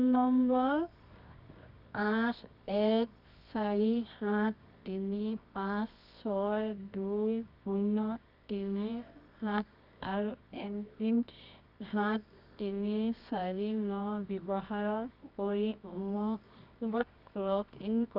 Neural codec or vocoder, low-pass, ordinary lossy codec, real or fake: codec, 24 kHz, 0.9 kbps, WavTokenizer, medium music audio release; 5.4 kHz; none; fake